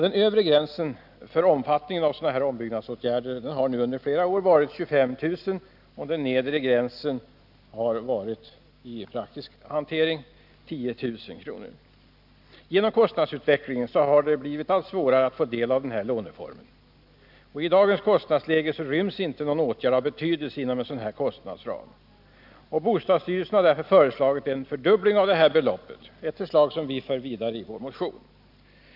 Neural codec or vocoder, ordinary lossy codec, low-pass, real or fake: none; none; 5.4 kHz; real